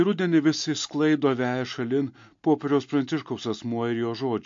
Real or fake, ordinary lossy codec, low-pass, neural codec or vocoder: real; MP3, 48 kbps; 7.2 kHz; none